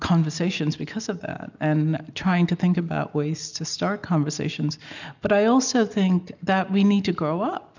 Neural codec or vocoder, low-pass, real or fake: none; 7.2 kHz; real